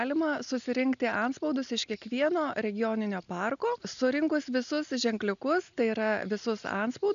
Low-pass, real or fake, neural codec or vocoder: 7.2 kHz; real; none